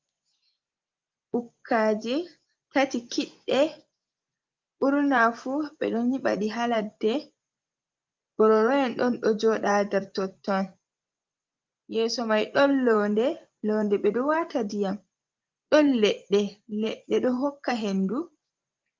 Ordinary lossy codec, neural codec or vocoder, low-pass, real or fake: Opus, 32 kbps; vocoder, 24 kHz, 100 mel bands, Vocos; 7.2 kHz; fake